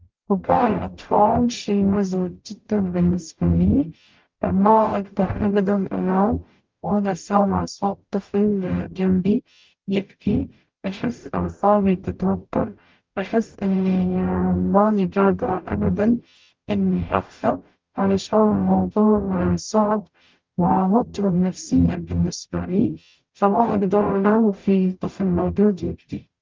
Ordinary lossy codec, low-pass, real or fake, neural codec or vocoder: Opus, 32 kbps; 7.2 kHz; fake; codec, 44.1 kHz, 0.9 kbps, DAC